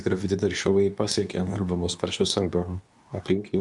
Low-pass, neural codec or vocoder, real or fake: 10.8 kHz; codec, 24 kHz, 0.9 kbps, WavTokenizer, medium speech release version 2; fake